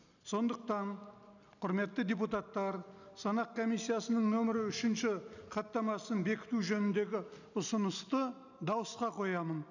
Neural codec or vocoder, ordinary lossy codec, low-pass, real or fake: none; none; 7.2 kHz; real